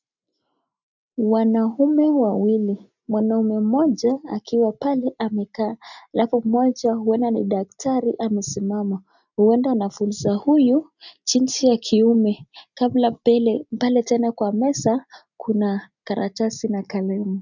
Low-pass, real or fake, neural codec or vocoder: 7.2 kHz; real; none